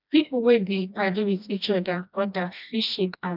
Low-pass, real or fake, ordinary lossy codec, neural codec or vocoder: 5.4 kHz; fake; none; codec, 16 kHz, 1 kbps, FreqCodec, smaller model